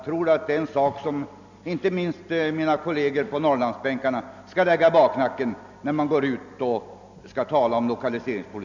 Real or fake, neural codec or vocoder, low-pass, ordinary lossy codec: real; none; 7.2 kHz; none